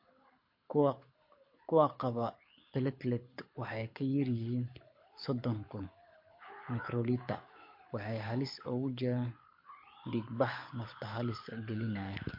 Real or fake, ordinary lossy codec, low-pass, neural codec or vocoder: fake; MP3, 32 kbps; 5.4 kHz; codec, 24 kHz, 6 kbps, HILCodec